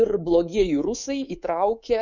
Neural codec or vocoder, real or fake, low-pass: codec, 24 kHz, 3.1 kbps, DualCodec; fake; 7.2 kHz